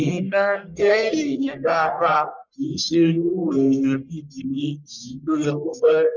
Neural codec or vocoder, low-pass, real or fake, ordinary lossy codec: codec, 44.1 kHz, 1.7 kbps, Pupu-Codec; 7.2 kHz; fake; none